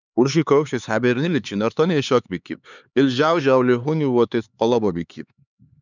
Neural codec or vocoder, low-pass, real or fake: codec, 16 kHz, 4 kbps, X-Codec, HuBERT features, trained on LibriSpeech; 7.2 kHz; fake